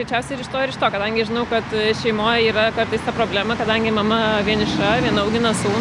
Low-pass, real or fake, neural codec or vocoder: 10.8 kHz; real; none